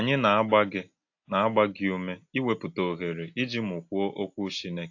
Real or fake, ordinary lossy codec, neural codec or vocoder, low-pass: real; AAC, 48 kbps; none; 7.2 kHz